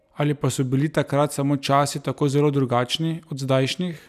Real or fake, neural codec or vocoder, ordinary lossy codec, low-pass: real; none; none; 14.4 kHz